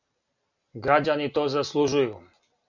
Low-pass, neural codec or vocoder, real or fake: 7.2 kHz; none; real